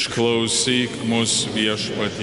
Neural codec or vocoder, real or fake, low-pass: none; real; 10.8 kHz